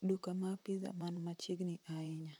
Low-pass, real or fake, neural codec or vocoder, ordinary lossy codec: 19.8 kHz; fake; vocoder, 44.1 kHz, 128 mel bands, Pupu-Vocoder; none